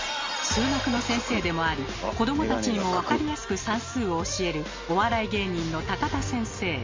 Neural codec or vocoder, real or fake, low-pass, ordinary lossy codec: none; real; 7.2 kHz; MP3, 32 kbps